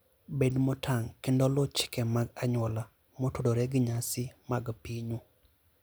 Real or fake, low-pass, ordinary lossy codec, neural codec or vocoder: real; none; none; none